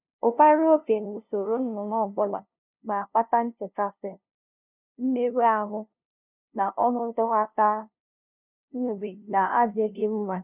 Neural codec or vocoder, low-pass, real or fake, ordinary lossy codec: codec, 16 kHz, 0.5 kbps, FunCodec, trained on LibriTTS, 25 frames a second; 3.6 kHz; fake; none